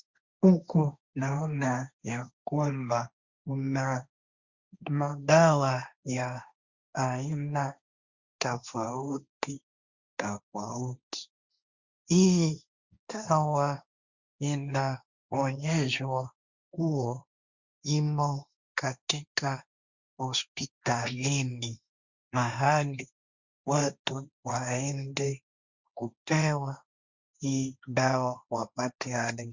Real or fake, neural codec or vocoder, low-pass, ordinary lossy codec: fake; codec, 16 kHz, 1.1 kbps, Voila-Tokenizer; 7.2 kHz; Opus, 64 kbps